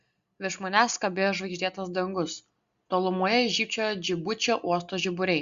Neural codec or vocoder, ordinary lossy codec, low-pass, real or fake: none; Opus, 64 kbps; 7.2 kHz; real